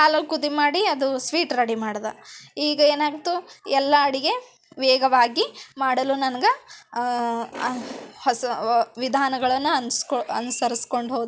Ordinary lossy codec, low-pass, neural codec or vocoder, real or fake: none; none; none; real